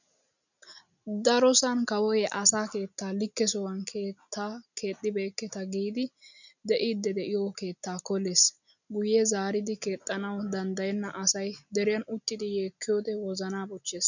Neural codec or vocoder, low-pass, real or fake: none; 7.2 kHz; real